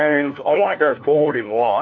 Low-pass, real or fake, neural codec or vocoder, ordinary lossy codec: 7.2 kHz; fake; codec, 16 kHz, 1 kbps, FunCodec, trained on LibriTTS, 50 frames a second; AAC, 48 kbps